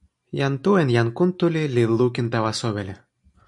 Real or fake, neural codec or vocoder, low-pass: real; none; 10.8 kHz